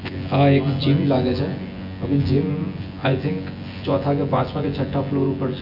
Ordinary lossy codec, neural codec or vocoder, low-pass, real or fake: none; vocoder, 24 kHz, 100 mel bands, Vocos; 5.4 kHz; fake